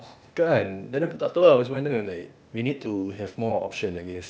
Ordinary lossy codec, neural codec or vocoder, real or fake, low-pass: none; codec, 16 kHz, 0.8 kbps, ZipCodec; fake; none